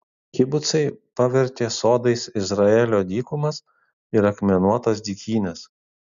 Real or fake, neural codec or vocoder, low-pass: real; none; 7.2 kHz